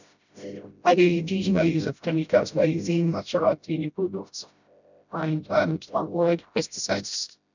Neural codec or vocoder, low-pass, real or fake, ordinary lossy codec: codec, 16 kHz, 0.5 kbps, FreqCodec, smaller model; 7.2 kHz; fake; none